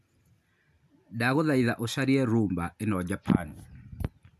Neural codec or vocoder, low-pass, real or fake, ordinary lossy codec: none; 14.4 kHz; real; none